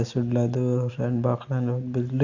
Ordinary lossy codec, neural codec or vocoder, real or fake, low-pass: AAC, 48 kbps; none; real; 7.2 kHz